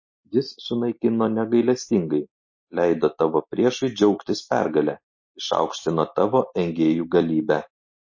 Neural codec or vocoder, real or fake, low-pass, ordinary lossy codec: none; real; 7.2 kHz; MP3, 32 kbps